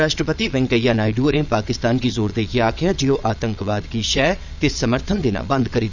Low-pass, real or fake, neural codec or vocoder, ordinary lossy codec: 7.2 kHz; fake; vocoder, 22.05 kHz, 80 mel bands, Vocos; none